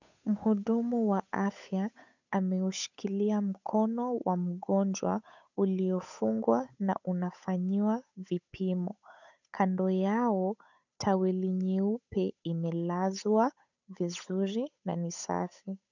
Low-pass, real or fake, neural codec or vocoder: 7.2 kHz; fake; codec, 16 kHz, 8 kbps, FunCodec, trained on Chinese and English, 25 frames a second